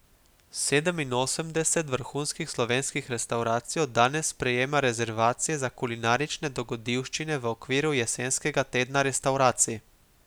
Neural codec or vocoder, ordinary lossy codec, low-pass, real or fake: none; none; none; real